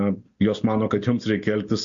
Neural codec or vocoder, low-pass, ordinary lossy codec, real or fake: none; 7.2 kHz; MP3, 48 kbps; real